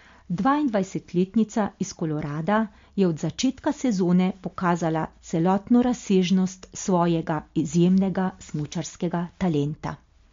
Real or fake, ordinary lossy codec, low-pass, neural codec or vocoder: real; MP3, 48 kbps; 7.2 kHz; none